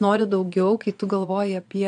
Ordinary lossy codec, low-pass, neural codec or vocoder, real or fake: AAC, 64 kbps; 9.9 kHz; vocoder, 22.05 kHz, 80 mel bands, Vocos; fake